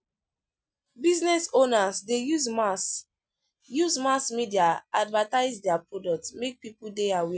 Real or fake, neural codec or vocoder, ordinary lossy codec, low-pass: real; none; none; none